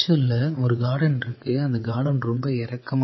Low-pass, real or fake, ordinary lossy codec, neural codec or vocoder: 7.2 kHz; fake; MP3, 24 kbps; vocoder, 22.05 kHz, 80 mel bands, WaveNeXt